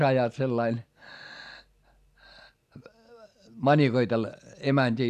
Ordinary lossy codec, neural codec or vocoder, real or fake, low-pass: none; none; real; 14.4 kHz